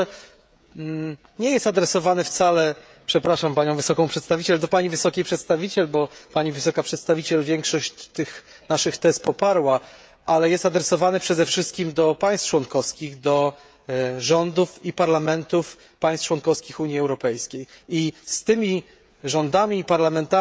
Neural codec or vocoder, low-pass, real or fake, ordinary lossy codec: codec, 16 kHz, 16 kbps, FreqCodec, smaller model; none; fake; none